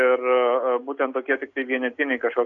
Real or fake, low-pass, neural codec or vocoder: real; 7.2 kHz; none